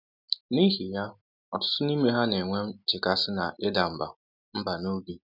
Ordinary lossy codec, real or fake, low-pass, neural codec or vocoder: none; real; 5.4 kHz; none